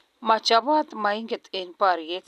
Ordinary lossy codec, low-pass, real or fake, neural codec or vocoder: none; 14.4 kHz; real; none